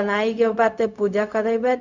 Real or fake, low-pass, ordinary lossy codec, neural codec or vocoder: fake; 7.2 kHz; none; codec, 16 kHz, 0.4 kbps, LongCat-Audio-Codec